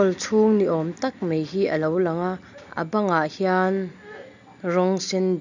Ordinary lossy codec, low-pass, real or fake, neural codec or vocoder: none; 7.2 kHz; real; none